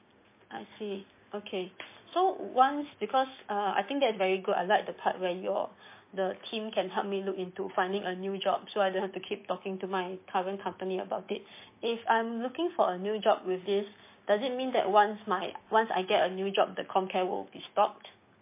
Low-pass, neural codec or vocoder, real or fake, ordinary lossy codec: 3.6 kHz; codec, 16 kHz, 6 kbps, DAC; fake; MP3, 24 kbps